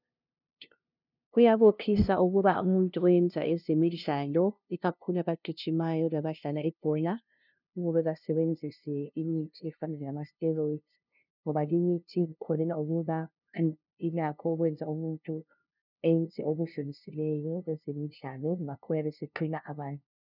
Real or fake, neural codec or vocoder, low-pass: fake; codec, 16 kHz, 0.5 kbps, FunCodec, trained on LibriTTS, 25 frames a second; 5.4 kHz